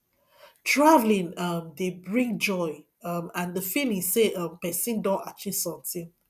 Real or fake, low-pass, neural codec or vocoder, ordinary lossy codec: real; 14.4 kHz; none; none